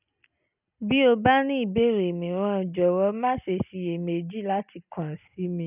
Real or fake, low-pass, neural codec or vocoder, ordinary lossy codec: real; 3.6 kHz; none; none